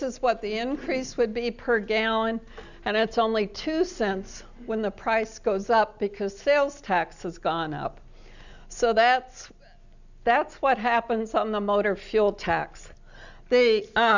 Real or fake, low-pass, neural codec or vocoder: real; 7.2 kHz; none